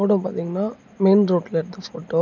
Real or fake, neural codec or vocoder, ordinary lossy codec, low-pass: real; none; none; 7.2 kHz